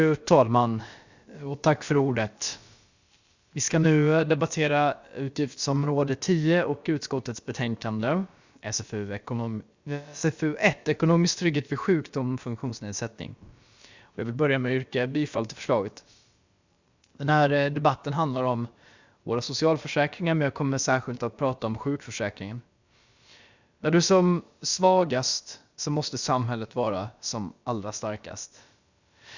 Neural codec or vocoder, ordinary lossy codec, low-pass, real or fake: codec, 16 kHz, about 1 kbps, DyCAST, with the encoder's durations; Opus, 64 kbps; 7.2 kHz; fake